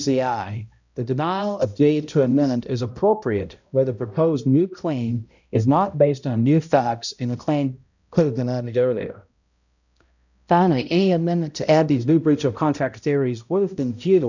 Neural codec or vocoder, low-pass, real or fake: codec, 16 kHz, 0.5 kbps, X-Codec, HuBERT features, trained on balanced general audio; 7.2 kHz; fake